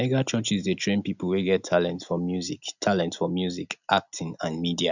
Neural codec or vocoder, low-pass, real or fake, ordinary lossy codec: none; 7.2 kHz; real; none